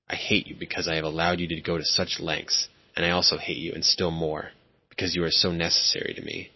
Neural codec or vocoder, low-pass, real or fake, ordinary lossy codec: none; 7.2 kHz; real; MP3, 24 kbps